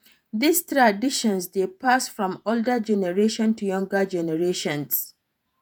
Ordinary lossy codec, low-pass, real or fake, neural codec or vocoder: none; none; fake; vocoder, 48 kHz, 128 mel bands, Vocos